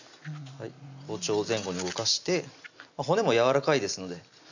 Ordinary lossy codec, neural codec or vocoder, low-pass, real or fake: none; none; 7.2 kHz; real